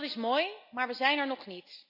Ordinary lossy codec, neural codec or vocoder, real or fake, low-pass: none; none; real; 5.4 kHz